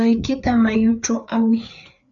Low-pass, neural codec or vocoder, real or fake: 7.2 kHz; codec, 16 kHz, 4 kbps, FreqCodec, larger model; fake